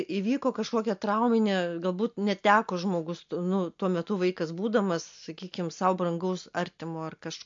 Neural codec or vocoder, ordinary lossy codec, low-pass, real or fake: none; MP3, 48 kbps; 7.2 kHz; real